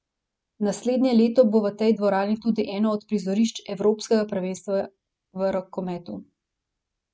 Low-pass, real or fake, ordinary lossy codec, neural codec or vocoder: none; real; none; none